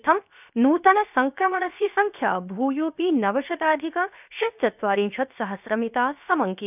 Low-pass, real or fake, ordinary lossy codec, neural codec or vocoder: 3.6 kHz; fake; none; codec, 16 kHz, 0.7 kbps, FocalCodec